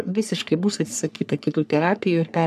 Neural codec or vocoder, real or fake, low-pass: codec, 44.1 kHz, 3.4 kbps, Pupu-Codec; fake; 14.4 kHz